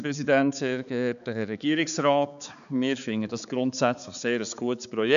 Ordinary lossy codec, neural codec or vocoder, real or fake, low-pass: none; codec, 16 kHz, 4 kbps, X-Codec, HuBERT features, trained on balanced general audio; fake; 7.2 kHz